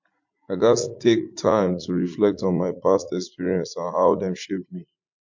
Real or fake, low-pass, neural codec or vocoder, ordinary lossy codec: fake; 7.2 kHz; vocoder, 44.1 kHz, 80 mel bands, Vocos; MP3, 48 kbps